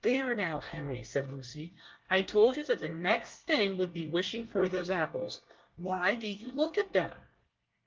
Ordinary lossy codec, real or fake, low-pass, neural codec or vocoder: Opus, 32 kbps; fake; 7.2 kHz; codec, 24 kHz, 1 kbps, SNAC